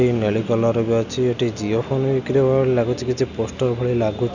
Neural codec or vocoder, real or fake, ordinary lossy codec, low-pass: none; real; none; 7.2 kHz